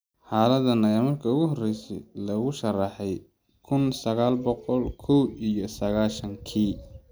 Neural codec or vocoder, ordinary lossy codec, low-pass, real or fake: none; none; none; real